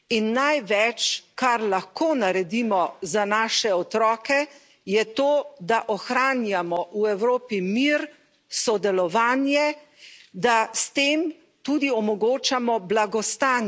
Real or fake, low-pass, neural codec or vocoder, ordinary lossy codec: real; none; none; none